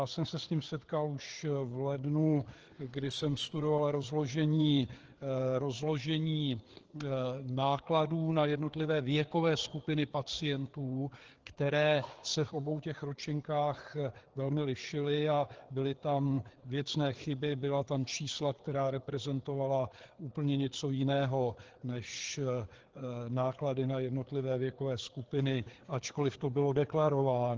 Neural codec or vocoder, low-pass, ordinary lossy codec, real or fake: codec, 16 kHz, 4 kbps, FreqCodec, larger model; 7.2 kHz; Opus, 16 kbps; fake